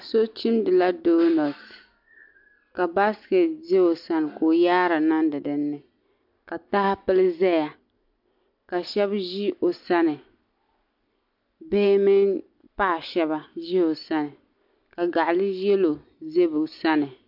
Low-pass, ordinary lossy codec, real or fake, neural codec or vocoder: 5.4 kHz; MP3, 48 kbps; real; none